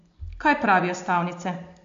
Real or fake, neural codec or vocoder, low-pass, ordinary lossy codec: real; none; 7.2 kHz; MP3, 48 kbps